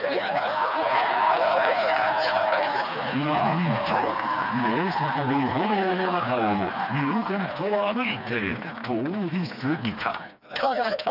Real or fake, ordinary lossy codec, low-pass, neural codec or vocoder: fake; none; 5.4 kHz; codec, 16 kHz, 2 kbps, FreqCodec, smaller model